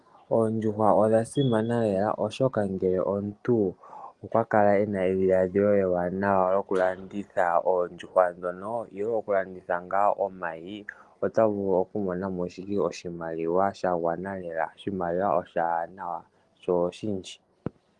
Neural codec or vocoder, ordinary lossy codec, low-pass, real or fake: none; Opus, 24 kbps; 10.8 kHz; real